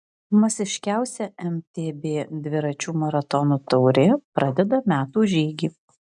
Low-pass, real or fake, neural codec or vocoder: 10.8 kHz; real; none